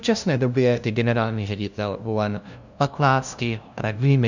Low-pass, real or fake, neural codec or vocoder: 7.2 kHz; fake; codec, 16 kHz, 0.5 kbps, FunCodec, trained on LibriTTS, 25 frames a second